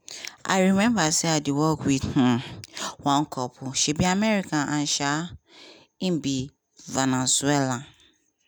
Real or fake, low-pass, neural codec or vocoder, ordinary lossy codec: real; none; none; none